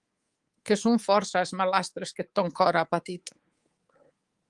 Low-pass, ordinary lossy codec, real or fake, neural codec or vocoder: 10.8 kHz; Opus, 24 kbps; fake; codec, 24 kHz, 3.1 kbps, DualCodec